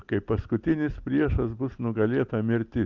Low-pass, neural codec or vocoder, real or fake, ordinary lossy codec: 7.2 kHz; none; real; Opus, 24 kbps